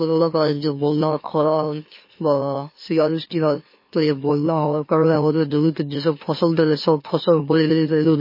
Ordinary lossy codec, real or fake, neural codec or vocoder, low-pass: MP3, 24 kbps; fake; autoencoder, 44.1 kHz, a latent of 192 numbers a frame, MeloTTS; 5.4 kHz